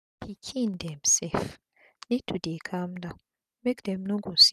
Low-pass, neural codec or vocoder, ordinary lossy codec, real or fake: 14.4 kHz; none; none; real